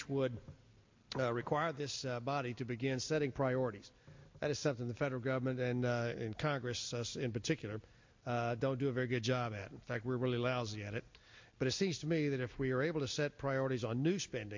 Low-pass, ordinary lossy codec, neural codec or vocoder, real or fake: 7.2 kHz; MP3, 48 kbps; none; real